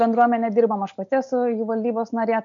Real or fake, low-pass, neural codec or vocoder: real; 7.2 kHz; none